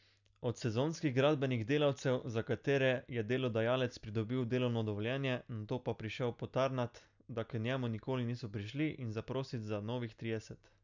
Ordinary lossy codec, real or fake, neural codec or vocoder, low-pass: none; real; none; 7.2 kHz